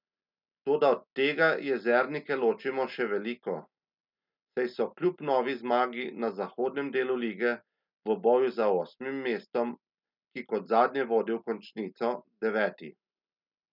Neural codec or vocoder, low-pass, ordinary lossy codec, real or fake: none; 5.4 kHz; none; real